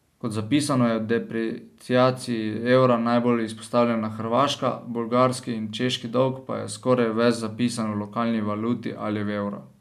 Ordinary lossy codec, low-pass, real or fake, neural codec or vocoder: none; 14.4 kHz; real; none